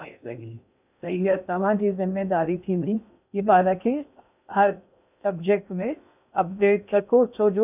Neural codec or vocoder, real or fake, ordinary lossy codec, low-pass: codec, 16 kHz in and 24 kHz out, 0.6 kbps, FocalCodec, streaming, 2048 codes; fake; none; 3.6 kHz